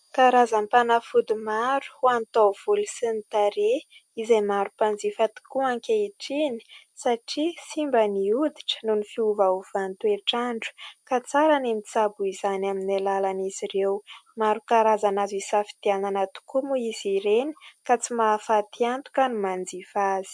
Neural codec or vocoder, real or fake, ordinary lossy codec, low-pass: none; real; MP3, 64 kbps; 9.9 kHz